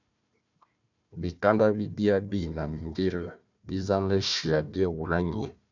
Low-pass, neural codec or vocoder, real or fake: 7.2 kHz; codec, 16 kHz, 1 kbps, FunCodec, trained on Chinese and English, 50 frames a second; fake